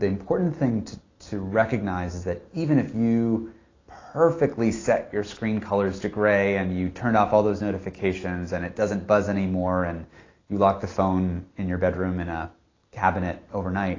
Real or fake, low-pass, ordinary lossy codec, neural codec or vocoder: real; 7.2 kHz; AAC, 32 kbps; none